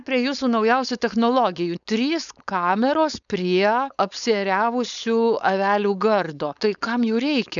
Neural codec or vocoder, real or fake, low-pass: codec, 16 kHz, 4.8 kbps, FACodec; fake; 7.2 kHz